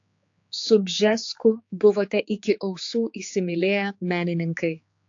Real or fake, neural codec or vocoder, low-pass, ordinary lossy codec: fake; codec, 16 kHz, 4 kbps, X-Codec, HuBERT features, trained on general audio; 7.2 kHz; AAC, 48 kbps